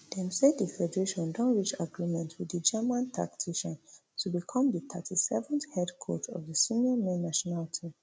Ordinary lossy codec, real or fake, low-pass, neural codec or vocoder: none; real; none; none